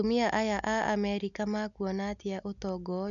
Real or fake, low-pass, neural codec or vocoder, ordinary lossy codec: real; 7.2 kHz; none; none